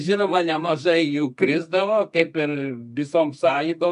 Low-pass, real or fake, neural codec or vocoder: 10.8 kHz; fake; codec, 24 kHz, 0.9 kbps, WavTokenizer, medium music audio release